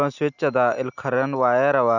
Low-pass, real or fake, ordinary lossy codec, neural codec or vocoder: 7.2 kHz; real; none; none